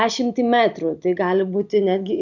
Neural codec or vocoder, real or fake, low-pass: none; real; 7.2 kHz